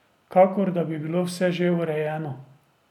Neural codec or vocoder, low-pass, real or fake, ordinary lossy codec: none; 19.8 kHz; real; none